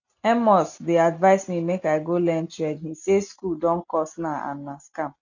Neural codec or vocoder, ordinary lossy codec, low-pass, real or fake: none; none; 7.2 kHz; real